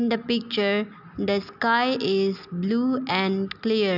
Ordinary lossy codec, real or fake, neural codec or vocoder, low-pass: none; real; none; 5.4 kHz